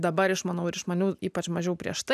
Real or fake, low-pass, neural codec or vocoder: real; 14.4 kHz; none